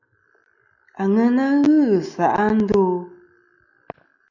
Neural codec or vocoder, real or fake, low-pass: none; real; 7.2 kHz